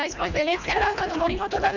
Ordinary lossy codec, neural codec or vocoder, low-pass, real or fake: none; codec, 24 kHz, 1.5 kbps, HILCodec; 7.2 kHz; fake